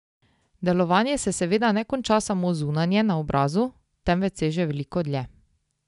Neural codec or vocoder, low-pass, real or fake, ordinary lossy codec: none; 10.8 kHz; real; none